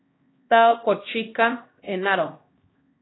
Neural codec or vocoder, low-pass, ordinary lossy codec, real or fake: codec, 16 kHz, 1 kbps, X-Codec, HuBERT features, trained on LibriSpeech; 7.2 kHz; AAC, 16 kbps; fake